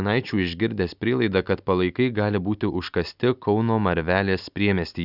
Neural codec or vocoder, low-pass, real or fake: none; 5.4 kHz; real